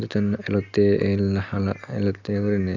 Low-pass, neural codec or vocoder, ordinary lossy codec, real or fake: 7.2 kHz; none; none; real